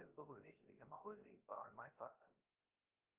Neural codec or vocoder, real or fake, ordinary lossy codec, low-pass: codec, 16 kHz, 0.3 kbps, FocalCodec; fake; Opus, 32 kbps; 3.6 kHz